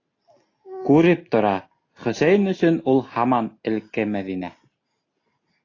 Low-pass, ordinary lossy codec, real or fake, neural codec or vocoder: 7.2 kHz; AAC, 32 kbps; real; none